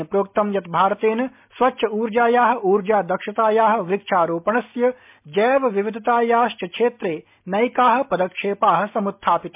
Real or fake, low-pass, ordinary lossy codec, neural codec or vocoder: real; 3.6 kHz; MP3, 32 kbps; none